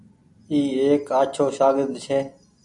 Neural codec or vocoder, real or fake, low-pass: none; real; 10.8 kHz